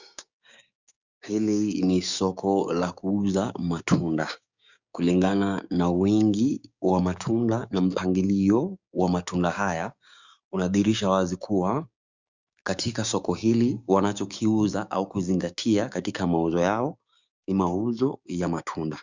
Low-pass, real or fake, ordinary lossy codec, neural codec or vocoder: 7.2 kHz; fake; Opus, 64 kbps; codec, 16 kHz, 6 kbps, DAC